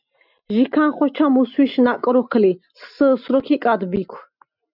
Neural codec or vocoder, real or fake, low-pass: none; real; 5.4 kHz